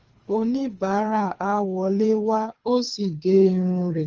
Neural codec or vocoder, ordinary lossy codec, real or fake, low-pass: codec, 24 kHz, 3 kbps, HILCodec; Opus, 24 kbps; fake; 7.2 kHz